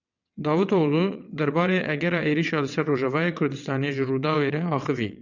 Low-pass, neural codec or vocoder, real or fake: 7.2 kHz; vocoder, 22.05 kHz, 80 mel bands, WaveNeXt; fake